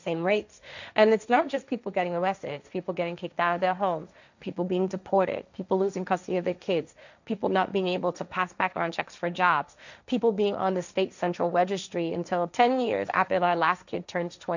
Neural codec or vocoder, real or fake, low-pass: codec, 16 kHz, 1.1 kbps, Voila-Tokenizer; fake; 7.2 kHz